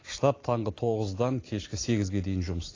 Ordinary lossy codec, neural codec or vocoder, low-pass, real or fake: AAC, 32 kbps; none; 7.2 kHz; real